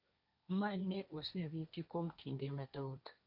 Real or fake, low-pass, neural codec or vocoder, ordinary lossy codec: fake; 5.4 kHz; codec, 16 kHz, 1.1 kbps, Voila-Tokenizer; none